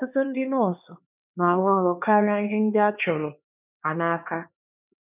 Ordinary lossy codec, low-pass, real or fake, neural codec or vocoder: none; 3.6 kHz; fake; codec, 16 kHz, 2 kbps, X-Codec, HuBERT features, trained on LibriSpeech